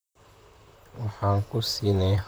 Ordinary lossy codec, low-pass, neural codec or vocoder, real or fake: none; none; vocoder, 44.1 kHz, 128 mel bands, Pupu-Vocoder; fake